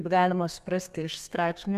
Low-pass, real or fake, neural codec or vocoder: 14.4 kHz; fake; codec, 32 kHz, 1.9 kbps, SNAC